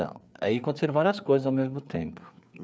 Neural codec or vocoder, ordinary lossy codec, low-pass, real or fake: codec, 16 kHz, 4 kbps, FreqCodec, larger model; none; none; fake